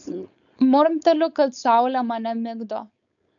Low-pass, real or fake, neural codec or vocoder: 7.2 kHz; fake; codec, 16 kHz, 4.8 kbps, FACodec